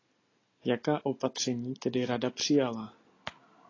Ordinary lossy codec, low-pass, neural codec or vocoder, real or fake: AAC, 32 kbps; 7.2 kHz; none; real